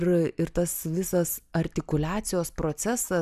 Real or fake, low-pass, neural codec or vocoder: real; 14.4 kHz; none